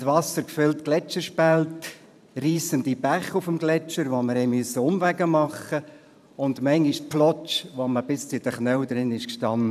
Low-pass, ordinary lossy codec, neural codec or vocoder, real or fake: 14.4 kHz; none; vocoder, 44.1 kHz, 128 mel bands every 512 samples, BigVGAN v2; fake